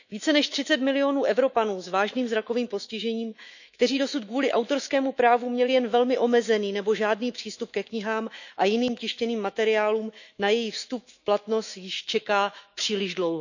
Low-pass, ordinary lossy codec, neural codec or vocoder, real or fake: 7.2 kHz; none; autoencoder, 48 kHz, 128 numbers a frame, DAC-VAE, trained on Japanese speech; fake